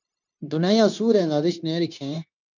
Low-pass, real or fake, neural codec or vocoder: 7.2 kHz; fake; codec, 16 kHz, 0.9 kbps, LongCat-Audio-Codec